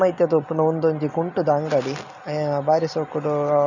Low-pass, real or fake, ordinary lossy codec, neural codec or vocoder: 7.2 kHz; real; none; none